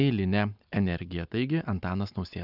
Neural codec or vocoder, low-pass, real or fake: none; 5.4 kHz; real